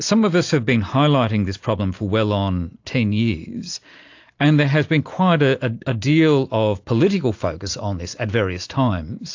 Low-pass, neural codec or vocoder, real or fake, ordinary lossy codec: 7.2 kHz; none; real; AAC, 48 kbps